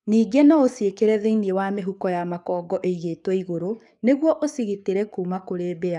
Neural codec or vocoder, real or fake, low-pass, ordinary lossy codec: codec, 44.1 kHz, 7.8 kbps, DAC; fake; 10.8 kHz; none